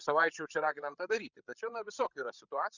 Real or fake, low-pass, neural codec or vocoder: fake; 7.2 kHz; codec, 16 kHz, 8 kbps, FreqCodec, larger model